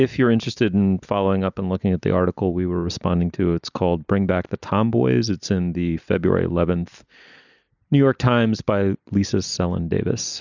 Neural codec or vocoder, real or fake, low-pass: none; real; 7.2 kHz